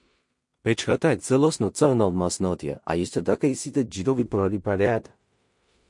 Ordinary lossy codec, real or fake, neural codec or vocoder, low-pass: MP3, 48 kbps; fake; codec, 16 kHz in and 24 kHz out, 0.4 kbps, LongCat-Audio-Codec, two codebook decoder; 10.8 kHz